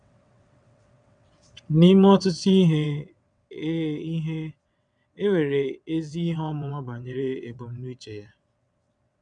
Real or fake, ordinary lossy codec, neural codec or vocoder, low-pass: fake; none; vocoder, 22.05 kHz, 80 mel bands, WaveNeXt; 9.9 kHz